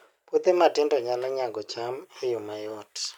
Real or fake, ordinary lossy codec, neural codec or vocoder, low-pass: real; none; none; 19.8 kHz